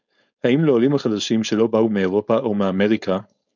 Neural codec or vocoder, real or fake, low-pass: codec, 16 kHz, 4.8 kbps, FACodec; fake; 7.2 kHz